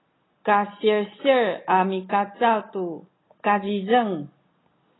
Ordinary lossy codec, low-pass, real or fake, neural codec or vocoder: AAC, 16 kbps; 7.2 kHz; real; none